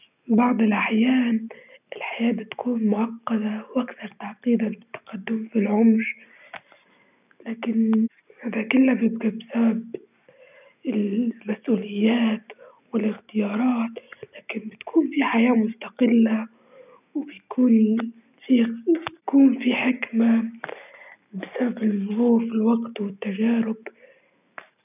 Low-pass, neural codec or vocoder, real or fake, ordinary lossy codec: 3.6 kHz; none; real; none